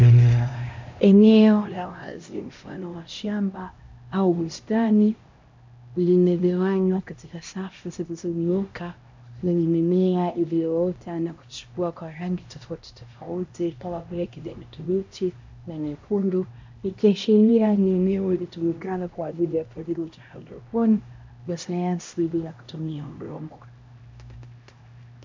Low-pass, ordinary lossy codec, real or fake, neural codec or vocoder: 7.2 kHz; MP3, 64 kbps; fake; codec, 16 kHz, 1 kbps, X-Codec, HuBERT features, trained on LibriSpeech